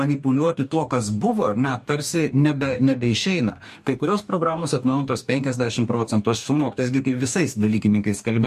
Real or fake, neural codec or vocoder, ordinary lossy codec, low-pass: fake; codec, 44.1 kHz, 2.6 kbps, DAC; MP3, 64 kbps; 14.4 kHz